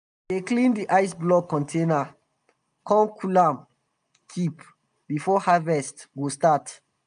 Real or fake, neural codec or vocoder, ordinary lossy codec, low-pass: real; none; MP3, 96 kbps; 9.9 kHz